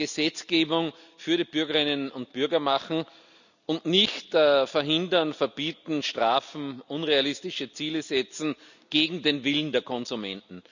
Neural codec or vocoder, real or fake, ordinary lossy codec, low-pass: none; real; none; 7.2 kHz